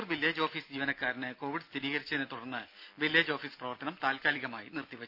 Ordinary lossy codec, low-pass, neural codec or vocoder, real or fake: none; 5.4 kHz; none; real